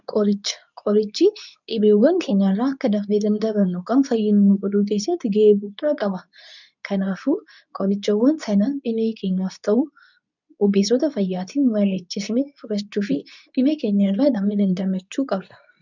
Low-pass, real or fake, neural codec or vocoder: 7.2 kHz; fake; codec, 24 kHz, 0.9 kbps, WavTokenizer, medium speech release version 2